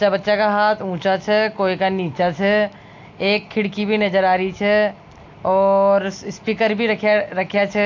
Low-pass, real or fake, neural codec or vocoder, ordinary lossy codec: 7.2 kHz; real; none; AAC, 48 kbps